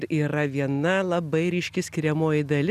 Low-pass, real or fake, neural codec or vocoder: 14.4 kHz; real; none